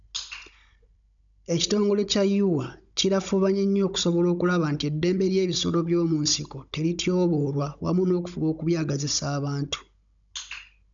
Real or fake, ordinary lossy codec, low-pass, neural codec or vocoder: fake; none; 7.2 kHz; codec, 16 kHz, 16 kbps, FunCodec, trained on Chinese and English, 50 frames a second